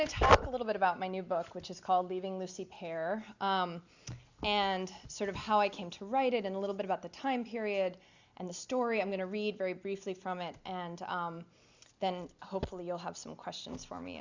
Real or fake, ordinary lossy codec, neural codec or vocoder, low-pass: real; Opus, 64 kbps; none; 7.2 kHz